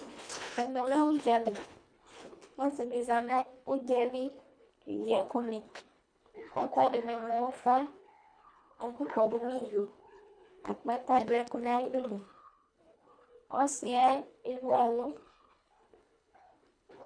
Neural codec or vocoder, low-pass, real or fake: codec, 24 kHz, 1.5 kbps, HILCodec; 9.9 kHz; fake